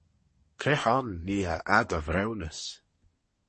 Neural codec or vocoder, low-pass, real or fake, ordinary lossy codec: codec, 24 kHz, 1 kbps, SNAC; 10.8 kHz; fake; MP3, 32 kbps